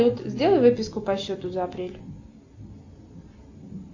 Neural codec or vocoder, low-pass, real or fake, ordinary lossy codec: none; 7.2 kHz; real; AAC, 48 kbps